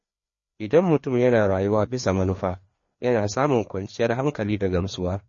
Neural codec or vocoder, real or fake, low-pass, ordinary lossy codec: codec, 16 kHz, 2 kbps, FreqCodec, larger model; fake; 7.2 kHz; MP3, 32 kbps